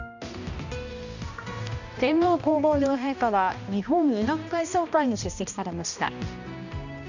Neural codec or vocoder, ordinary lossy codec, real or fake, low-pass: codec, 16 kHz, 1 kbps, X-Codec, HuBERT features, trained on balanced general audio; none; fake; 7.2 kHz